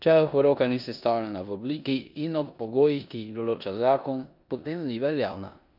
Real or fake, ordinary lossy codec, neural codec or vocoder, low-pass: fake; none; codec, 16 kHz in and 24 kHz out, 0.9 kbps, LongCat-Audio-Codec, four codebook decoder; 5.4 kHz